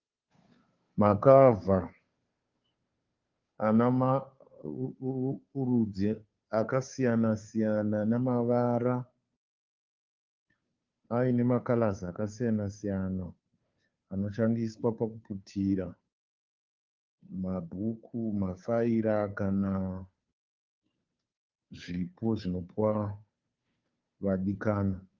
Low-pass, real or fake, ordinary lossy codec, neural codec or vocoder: 7.2 kHz; fake; Opus, 24 kbps; codec, 16 kHz, 2 kbps, FunCodec, trained on Chinese and English, 25 frames a second